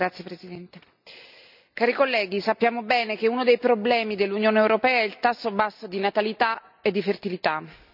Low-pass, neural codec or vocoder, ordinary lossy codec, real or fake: 5.4 kHz; none; none; real